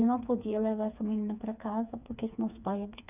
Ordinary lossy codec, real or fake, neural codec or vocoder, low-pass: none; fake; codec, 16 kHz, 4 kbps, FreqCodec, smaller model; 3.6 kHz